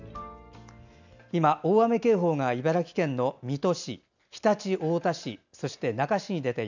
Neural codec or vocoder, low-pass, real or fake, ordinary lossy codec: none; 7.2 kHz; real; none